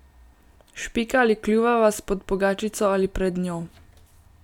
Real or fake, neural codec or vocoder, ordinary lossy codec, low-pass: real; none; none; 19.8 kHz